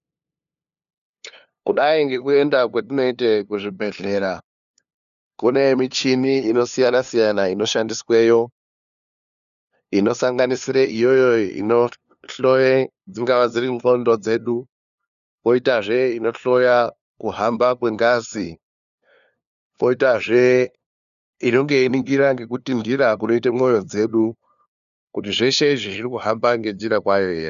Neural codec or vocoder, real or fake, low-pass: codec, 16 kHz, 2 kbps, FunCodec, trained on LibriTTS, 25 frames a second; fake; 7.2 kHz